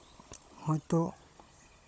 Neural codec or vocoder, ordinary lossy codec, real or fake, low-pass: codec, 16 kHz, 16 kbps, FunCodec, trained on Chinese and English, 50 frames a second; none; fake; none